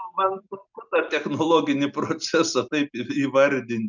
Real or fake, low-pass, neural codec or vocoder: real; 7.2 kHz; none